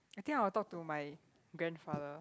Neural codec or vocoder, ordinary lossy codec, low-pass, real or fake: none; none; none; real